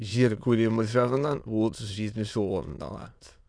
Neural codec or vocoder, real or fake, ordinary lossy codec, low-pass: autoencoder, 22.05 kHz, a latent of 192 numbers a frame, VITS, trained on many speakers; fake; none; 9.9 kHz